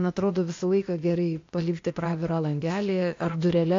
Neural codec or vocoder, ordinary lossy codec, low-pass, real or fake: codec, 16 kHz, 0.8 kbps, ZipCodec; AAC, 48 kbps; 7.2 kHz; fake